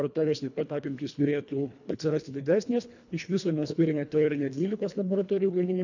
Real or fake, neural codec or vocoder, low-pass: fake; codec, 24 kHz, 1.5 kbps, HILCodec; 7.2 kHz